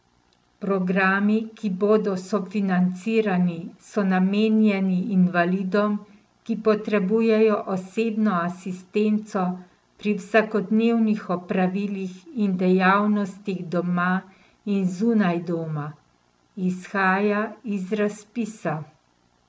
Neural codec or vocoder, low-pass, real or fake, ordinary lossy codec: none; none; real; none